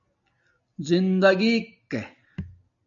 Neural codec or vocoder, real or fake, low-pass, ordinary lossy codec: none; real; 7.2 kHz; AAC, 64 kbps